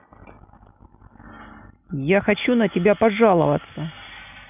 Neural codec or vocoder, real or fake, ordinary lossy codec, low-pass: none; real; none; 3.6 kHz